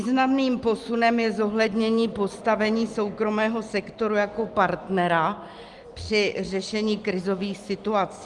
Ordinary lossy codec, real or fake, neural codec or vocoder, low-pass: Opus, 24 kbps; real; none; 10.8 kHz